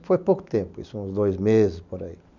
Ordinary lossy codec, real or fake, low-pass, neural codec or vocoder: none; real; 7.2 kHz; none